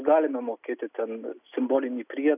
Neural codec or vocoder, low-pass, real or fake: none; 3.6 kHz; real